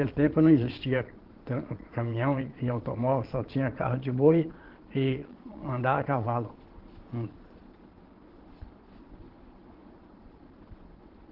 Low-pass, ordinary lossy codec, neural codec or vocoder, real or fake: 5.4 kHz; Opus, 16 kbps; vocoder, 22.05 kHz, 80 mel bands, Vocos; fake